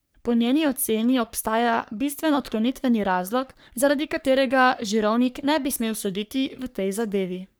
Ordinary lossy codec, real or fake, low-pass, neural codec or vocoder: none; fake; none; codec, 44.1 kHz, 3.4 kbps, Pupu-Codec